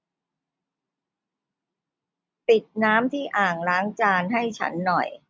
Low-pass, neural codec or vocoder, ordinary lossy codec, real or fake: none; none; none; real